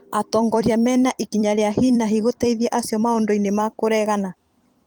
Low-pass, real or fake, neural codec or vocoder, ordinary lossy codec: 19.8 kHz; fake; vocoder, 44.1 kHz, 128 mel bands every 256 samples, BigVGAN v2; Opus, 24 kbps